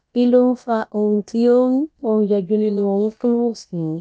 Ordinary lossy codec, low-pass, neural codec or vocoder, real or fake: none; none; codec, 16 kHz, about 1 kbps, DyCAST, with the encoder's durations; fake